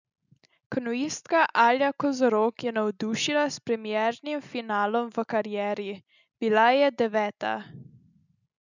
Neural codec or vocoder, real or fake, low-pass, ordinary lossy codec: none; real; 7.2 kHz; none